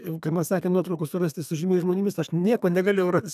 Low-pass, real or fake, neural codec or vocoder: 14.4 kHz; fake; codec, 32 kHz, 1.9 kbps, SNAC